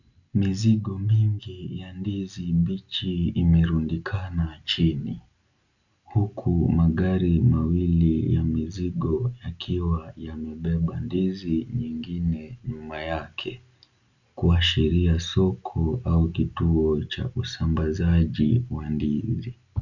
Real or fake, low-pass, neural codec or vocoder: real; 7.2 kHz; none